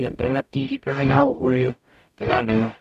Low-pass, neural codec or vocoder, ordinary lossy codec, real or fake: 14.4 kHz; codec, 44.1 kHz, 0.9 kbps, DAC; none; fake